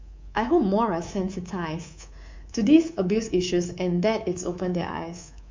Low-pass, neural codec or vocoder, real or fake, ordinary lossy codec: 7.2 kHz; codec, 24 kHz, 3.1 kbps, DualCodec; fake; MP3, 64 kbps